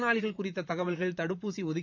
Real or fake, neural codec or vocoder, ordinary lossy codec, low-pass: fake; vocoder, 44.1 kHz, 128 mel bands, Pupu-Vocoder; none; 7.2 kHz